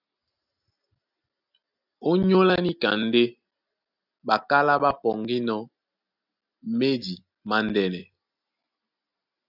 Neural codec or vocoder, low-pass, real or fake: none; 5.4 kHz; real